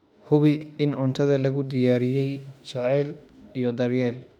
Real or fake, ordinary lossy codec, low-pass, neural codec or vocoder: fake; none; 19.8 kHz; autoencoder, 48 kHz, 32 numbers a frame, DAC-VAE, trained on Japanese speech